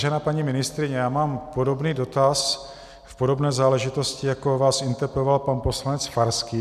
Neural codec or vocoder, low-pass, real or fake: none; 14.4 kHz; real